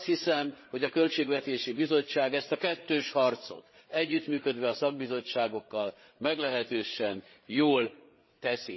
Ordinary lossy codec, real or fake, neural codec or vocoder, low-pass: MP3, 24 kbps; fake; codec, 16 kHz, 8 kbps, FreqCodec, larger model; 7.2 kHz